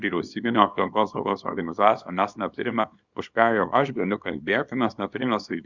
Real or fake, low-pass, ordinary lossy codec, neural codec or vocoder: fake; 7.2 kHz; Opus, 64 kbps; codec, 24 kHz, 0.9 kbps, WavTokenizer, small release